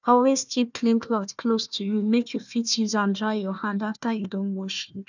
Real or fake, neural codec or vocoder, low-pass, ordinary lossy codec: fake; codec, 16 kHz, 1 kbps, FunCodec, trained on Chinese and English, 50 frames a second; 7.2 kHz; none